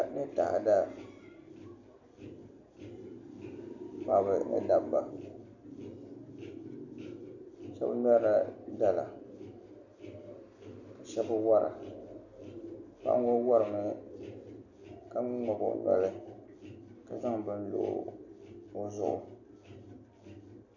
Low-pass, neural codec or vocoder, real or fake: 7.2 kHz; none; real